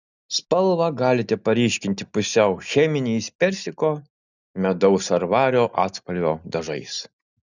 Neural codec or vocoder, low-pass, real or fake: none; 7.2 kHz; real